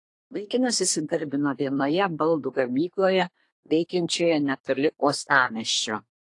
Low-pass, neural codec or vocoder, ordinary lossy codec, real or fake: 10.8 kHz; codec, 24 kHz, 1 kbps, SNAC; AAC, 48 kbps; fake